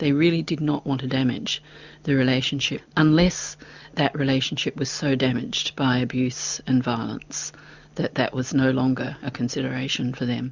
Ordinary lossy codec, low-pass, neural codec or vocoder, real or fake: Opus, 64 kbps; 7.2 kHz; none; real